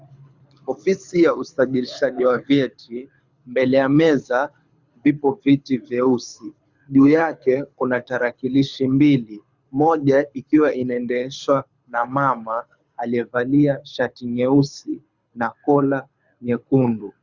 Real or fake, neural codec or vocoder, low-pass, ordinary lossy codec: fake; codec, 24 kHz, 6 kbps, HILCodec; 7.2 kHz; Opus, 64 kbps